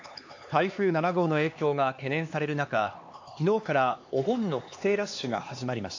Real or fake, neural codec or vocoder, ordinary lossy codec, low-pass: fake; codec, 16 kHz, 2 kbps, X-Codec, HuBERT features, trained on LibriSpeech; AAC, 48 kbps; 7.2 kHz